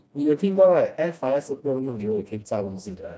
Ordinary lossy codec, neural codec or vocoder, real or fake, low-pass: none; codec, 16 kHz, 1 kbps, FreqCodec, smaller model; fake; none